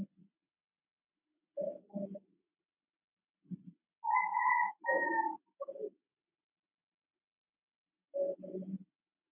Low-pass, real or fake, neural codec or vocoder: 3.6 kHz; real; none